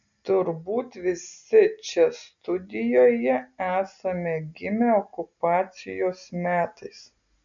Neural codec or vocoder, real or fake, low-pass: none; real; 7.2 kHz